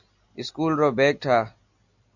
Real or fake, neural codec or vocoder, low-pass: real; none; 7.2 kHz